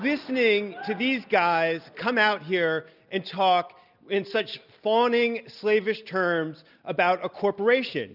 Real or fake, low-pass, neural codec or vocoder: real; 5.4 kHz; none